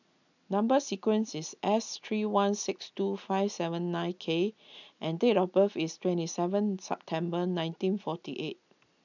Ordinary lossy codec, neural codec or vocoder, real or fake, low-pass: none; none; real; 7.2 kHz